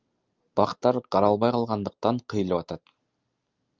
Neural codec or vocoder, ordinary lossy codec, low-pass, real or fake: none; Opus, 24 kbps; 7.2 kHz; real